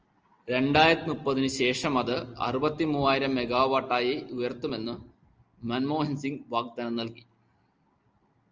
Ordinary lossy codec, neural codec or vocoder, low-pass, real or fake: Opus, 24 kbps; none; 7.2 kHz; real